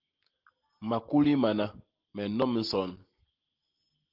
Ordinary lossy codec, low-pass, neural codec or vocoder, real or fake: Opus, 16 kbps; 5.4 kHz; none; real